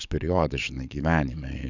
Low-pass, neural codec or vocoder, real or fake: 7.2 kHz; codec, 44.1 kHz, 7.8 kbps, DAC; fake